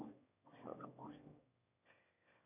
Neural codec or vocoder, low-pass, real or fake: autoencoder, 22.05 kHz, a latent of 192 numbers a frame, VITS, trained on one speaker; 3.6 kHz; fake